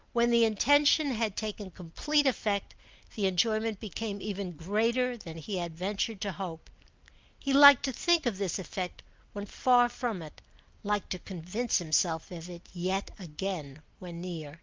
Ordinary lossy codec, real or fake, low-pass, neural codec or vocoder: Opus, 32 kbps; real; 7.2 kHz; none